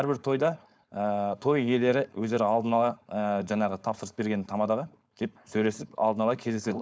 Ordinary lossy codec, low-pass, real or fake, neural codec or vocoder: none; none; fake; codec, 16 kHz, 4.8 kbps, FACodec